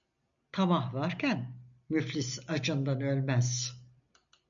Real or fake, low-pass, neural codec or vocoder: real; 7.2 kHz; none